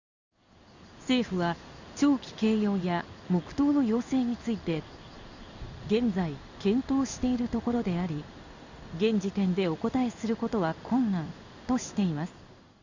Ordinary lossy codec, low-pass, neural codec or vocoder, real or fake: Opus, 64 kbps; 7.2 kHz; codec, 16 kHz in and 24 kHz out, 1 kbps, XY-Tokenizer; fake